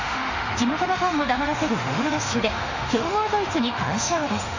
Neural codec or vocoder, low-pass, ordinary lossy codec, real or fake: autoencoder, 48 kHz, 32 numbers a frame, DAC-VAE, trained on Japanese speech; 7.2 kHz; none; fake